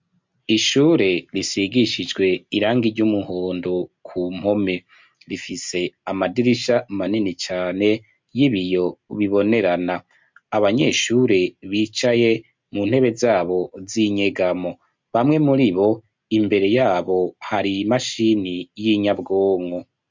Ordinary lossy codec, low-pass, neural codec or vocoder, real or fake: MP3, 64 kbps; 7.2 kHz; none; real